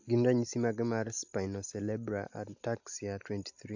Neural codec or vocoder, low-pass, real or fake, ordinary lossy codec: none; 7.2 kHz; real; AAC, 48 kbps